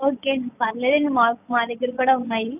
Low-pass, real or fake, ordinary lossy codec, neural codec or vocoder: 3.6 kHz; real; none; none